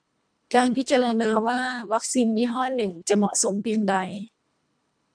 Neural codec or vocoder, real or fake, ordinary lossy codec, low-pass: codec, 24 kHz, 1.5 kbps, HILCodec; fake; MP3, 96 kbps; 9.9 kHz